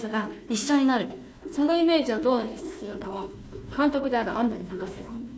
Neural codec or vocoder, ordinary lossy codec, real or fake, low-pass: codec, 16 kHz, 1 kbps, FunCodec, trained on Chinese and English, 50 frames a second; none; fake; none